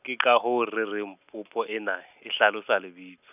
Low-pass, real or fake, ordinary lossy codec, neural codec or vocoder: 3.6 kHz; real; none; none